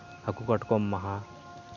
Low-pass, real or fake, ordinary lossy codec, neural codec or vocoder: 7.2 kHz; real; MP3, 64 kbps; none